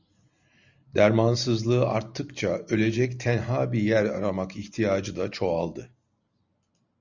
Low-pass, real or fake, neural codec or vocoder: 7.2 kHz; real; none